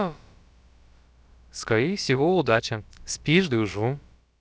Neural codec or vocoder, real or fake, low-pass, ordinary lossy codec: codec, 16 kHz, about 1 kbps, DyCAST, with the encoder's durations; fake; none; none